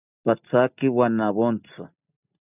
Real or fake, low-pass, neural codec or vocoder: real; 3.6 kHz; none